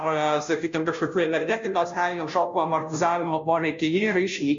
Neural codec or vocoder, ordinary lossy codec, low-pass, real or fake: codec, 16 kHz, 0.5 kbps, FunCodec, trained on Chinese and English, 25 frames a second; MP3, 48 kbps; 7.2 kHz; fake